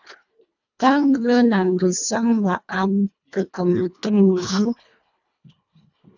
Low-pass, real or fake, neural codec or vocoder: 7.2 kHz; fake; codec, 24 kHz, 1.5 kbps, HILCodec